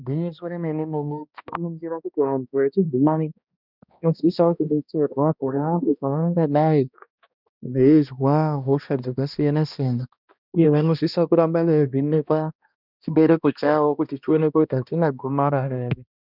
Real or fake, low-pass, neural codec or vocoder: fake; 5.4 kHz; codec, 16 kHz, 1 kbps, X-Codec, HuBERT features, trained on balanced general audio